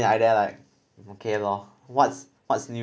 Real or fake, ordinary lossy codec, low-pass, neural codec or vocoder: real; none; none; none